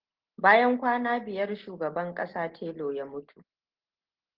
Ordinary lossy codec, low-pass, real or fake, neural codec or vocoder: Opus, 16 kbps; 5.4 kHz; real; none